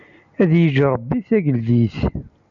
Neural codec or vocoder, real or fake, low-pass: none; real; 7.2 kHz